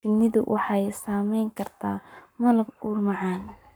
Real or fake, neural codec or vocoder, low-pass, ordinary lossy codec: fake; codec, 44.1 kHz, 7.8 kbps, Pupu-Codec; none; none